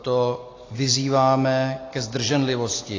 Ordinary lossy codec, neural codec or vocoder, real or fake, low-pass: AAC, 32 kbps; none; real; 7.2 kHz